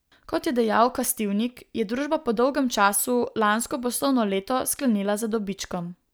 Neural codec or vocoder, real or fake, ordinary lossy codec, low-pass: none; real; none; none